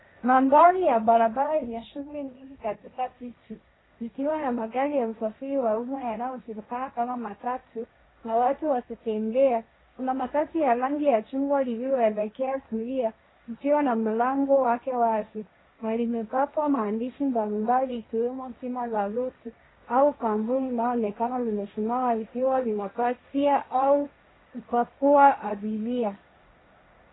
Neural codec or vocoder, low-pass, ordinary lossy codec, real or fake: codec, 16 kHz, 1.1 kbps, Voila-Tokenizer; 7.2 kHz; AAC, 16 kbps; fake